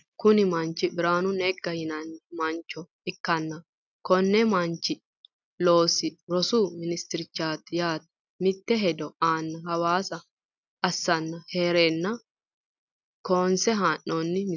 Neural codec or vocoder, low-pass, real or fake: none; 7.2 kHz; real